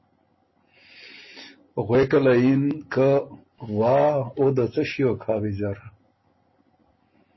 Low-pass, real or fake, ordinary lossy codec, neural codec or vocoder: 7.2 kHz; real; MP3, 24 kbps; none